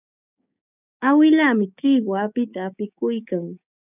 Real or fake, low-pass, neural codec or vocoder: fake; 3.6 kHz; codec, 16 kHz, 6 kbps, DAC